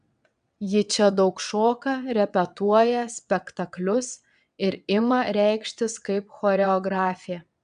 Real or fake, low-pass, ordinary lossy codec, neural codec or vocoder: fake; 9.9 kHz; AAC, 96 kbps; vocoder, 22.05 kHz, 80 mel bands, WaveNeXt